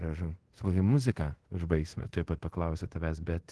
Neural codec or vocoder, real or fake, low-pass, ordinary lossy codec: codec, 24 kHz, 0.5 kbps, DualCodec; fake; 10.8 kHz; Opus, 16 kbps